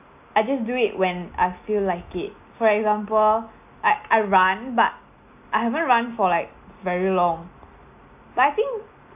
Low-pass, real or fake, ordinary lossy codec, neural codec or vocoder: 3.6 kHz; real; AAC, 32 kbps; none